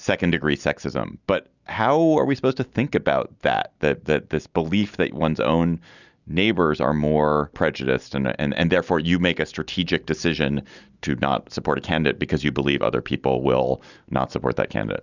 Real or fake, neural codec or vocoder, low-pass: real; none; 7.2 kHz